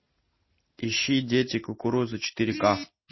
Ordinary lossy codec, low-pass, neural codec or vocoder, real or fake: MP3, 24 kbps; 7.2 kHz; none; real